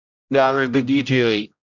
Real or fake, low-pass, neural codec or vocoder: fake; 7.2 kHz; codec, 16 kHz, 0.5 kbps, X-Codec, HuBERT features, trained on general audio